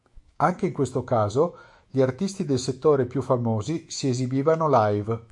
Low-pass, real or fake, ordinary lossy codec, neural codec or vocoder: 10.8 kHz; fake; MP3, 96 kbps; autoencoder, 48 kHz, 128 numbers a frame, DAC-VAE, trained on Japanese speech